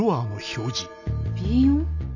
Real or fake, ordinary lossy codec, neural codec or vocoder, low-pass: real; none; none; 7.2 kHz